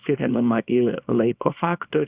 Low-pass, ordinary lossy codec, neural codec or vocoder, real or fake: 3.6 kHz; Opus, 64 kbps; codec, 24 kHz, 0.9 kbps, WavTokenizer, small release; fake